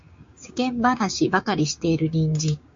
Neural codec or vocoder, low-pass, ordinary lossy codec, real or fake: codec, 16 kHz, 6 kbps, DAC; 7.2 kHz; MP3, 48 kbps; fake